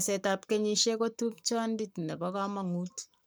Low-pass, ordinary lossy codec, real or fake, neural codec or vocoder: none; none; fake; codec, 44.1 kHz, 7.8 kbps, Pupu-Codec